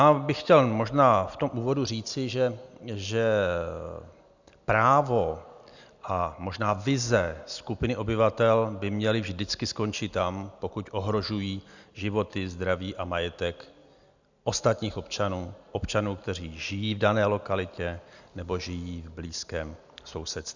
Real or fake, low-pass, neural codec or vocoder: real; 7.2 kHz; none